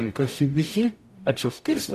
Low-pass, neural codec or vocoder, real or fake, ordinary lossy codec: 14.4 kHz; codec, 44.1 kHz, 0.9 kbps, DAC; fake; MP3, 96 kbps